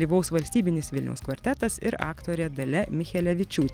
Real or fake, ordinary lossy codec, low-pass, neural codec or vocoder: fake; Opus, 24 kbps; 19.8 kHz; vocoder, 44.1 kHz, 128 mel bands every 256 samples, BigVGAN v2